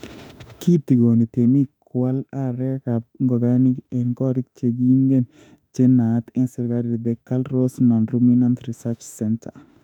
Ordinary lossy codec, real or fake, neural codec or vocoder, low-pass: none; fake; autoencoder, 48 kHz, 32 numbers a frame, DAC-VAE, trained on Japanese speech; 19.8 kHz